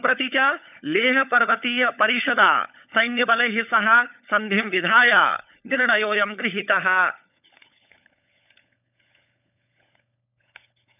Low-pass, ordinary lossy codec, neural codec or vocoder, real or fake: 3.6 kHz; none; codec, 16 kHz, 16 kbps, FunCodec, trained on LibriTTS, 50 frames a second; fake